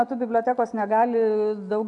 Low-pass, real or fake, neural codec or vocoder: 10.8 kHz; real; none